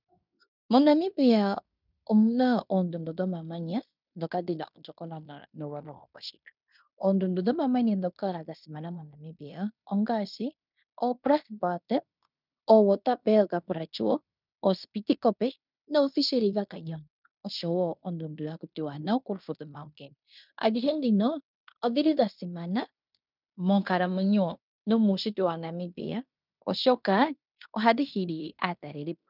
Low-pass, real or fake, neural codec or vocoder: 5.4 kHz; fake; codec, 16 kHz in and 24 kHz out, 0.9 kbps, LongCat-Audio-Codec, fine tuned four codebook decoder